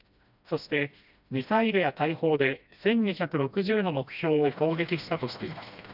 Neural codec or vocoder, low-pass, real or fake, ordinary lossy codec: codec, 16 kHz, 1 kbps, FreqCodec, smaller model; 5.4 kHz; fake; none